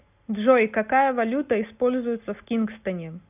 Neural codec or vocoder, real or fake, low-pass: none; real; 3.6 kHz